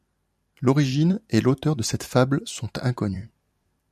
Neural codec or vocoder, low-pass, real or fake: vocoder, 44.1 kHz, 128 mel bands every 256 samples, BigVGAN v2; 14.4 kHz; fake